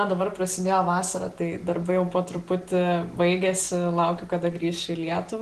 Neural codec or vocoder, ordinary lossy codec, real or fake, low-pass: none; Opus, 16 kbps; real; 10.8 kHz